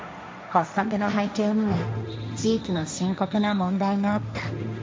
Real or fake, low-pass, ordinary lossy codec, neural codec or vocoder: fake; none; none; codec, 16 kHz, 1.1 kbps, Voila-Tokenizer